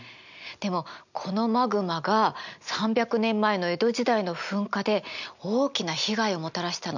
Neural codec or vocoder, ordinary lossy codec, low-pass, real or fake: none; none; 7.2 kHz; real